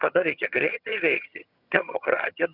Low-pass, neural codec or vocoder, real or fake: 5.4 kHz; vocoder, 22.05 kHz, 80 mel bands, HiFi-GAN; fake